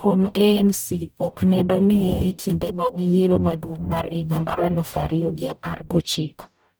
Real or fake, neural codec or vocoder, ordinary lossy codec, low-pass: fake; codec, 44.1 kHz, 0.9 kbps, DAC; none; none